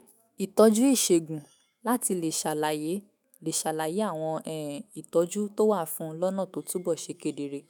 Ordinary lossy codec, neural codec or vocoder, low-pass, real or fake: none; autoencoder, 48 kHz, 128 numbers a frame, DAC-VAE, trained on Japanese speech; none; fake